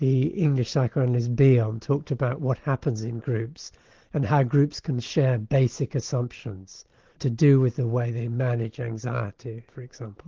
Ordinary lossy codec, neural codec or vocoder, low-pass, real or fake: Opus, 16 kbps; none; 7.2 kHz; real